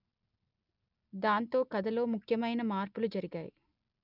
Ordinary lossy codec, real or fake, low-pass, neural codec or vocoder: none; real; 5.4 kHz; none